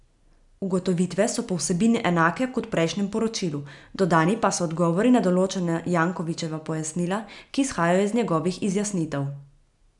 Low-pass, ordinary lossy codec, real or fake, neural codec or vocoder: 10.8 kHz; none; real; none